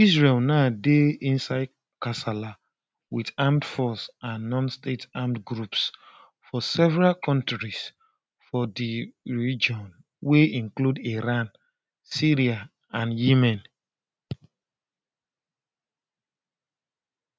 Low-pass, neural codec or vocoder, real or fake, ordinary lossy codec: none; none; real; none